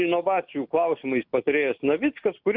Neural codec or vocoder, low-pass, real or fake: none; 5.4 kHz; real